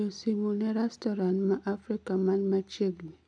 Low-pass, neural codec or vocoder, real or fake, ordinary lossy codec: 9.9 kHz; none; real; none